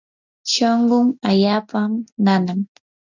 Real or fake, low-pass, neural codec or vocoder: real; 7.2 kHz; none